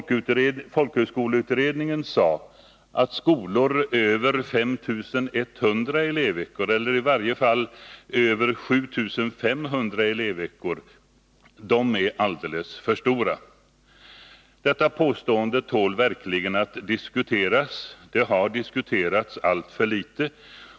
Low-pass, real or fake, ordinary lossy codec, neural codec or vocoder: none; real; none; none